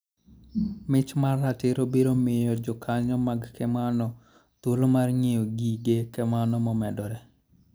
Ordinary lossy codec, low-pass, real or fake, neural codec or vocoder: none; none; real; none